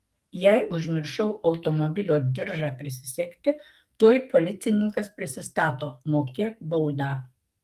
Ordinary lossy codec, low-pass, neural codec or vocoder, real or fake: Opus, 32 kbps; 14.4 kHz; codec, 44.1 kHz, 2.6 kbps, SNAC; fake